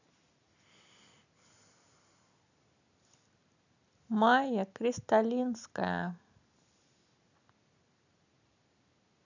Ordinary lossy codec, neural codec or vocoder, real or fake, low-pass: none; none; real; 7.2 kHz